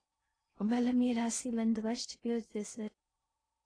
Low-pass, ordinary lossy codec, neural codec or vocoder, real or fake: 9.9 kHz; AAC, 32 kbps; codec, 16 kHz in and 24 kHz out, 0.6 kbps, FocalCodec, streaming, 2048 codes; fake